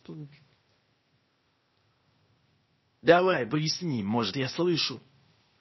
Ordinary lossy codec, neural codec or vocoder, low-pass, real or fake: MP3, 24 kbps; codec, 16 kHz, 0.8 kbps, ZipCodec; 7.2 kHz; fake